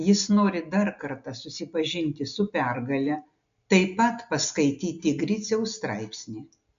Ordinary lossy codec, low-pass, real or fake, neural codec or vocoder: MP3, 64 kbps; 7.2 kHz; real; none